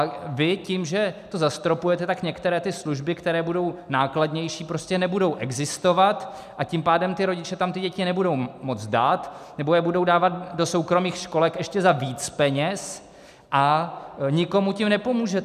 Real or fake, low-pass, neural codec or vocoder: real; 14.4 kHz; none